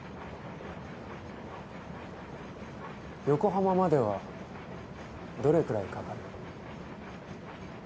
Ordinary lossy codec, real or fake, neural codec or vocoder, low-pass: none; real; none; none